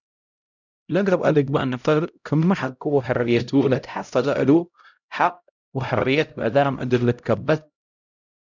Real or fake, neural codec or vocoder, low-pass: fake; codec, 16 kHz, 0.5 kbps, X-Codec, HuBERT features, trained on LibriSpeech; 7.2 kHz